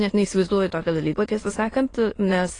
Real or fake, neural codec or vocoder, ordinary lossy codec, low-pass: fake; autoencoder, 22.05 kHz, a latent of 192 numbers a frame, VITS, trained on many speakers; AAC, 32 kbps; 9.9 kHz